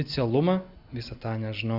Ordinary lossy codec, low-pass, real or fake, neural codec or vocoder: Opus, 64 kbps; 5.4 kHz; real; none